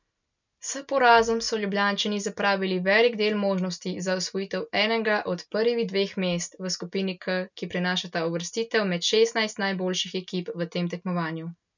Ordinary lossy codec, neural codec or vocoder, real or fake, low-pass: none; none; real; 7.2 kHz